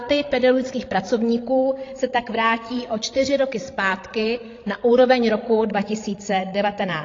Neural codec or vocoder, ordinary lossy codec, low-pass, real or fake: codec, 16 kHz, 16 kbps, FreqCodec, larger model; AAC, 32 kbps; 7.2 kHz; fake